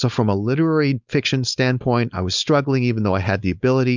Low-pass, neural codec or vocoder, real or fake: 7.2 kHz; codec, 16 kHz, 4.8 kbps, FACodec; fake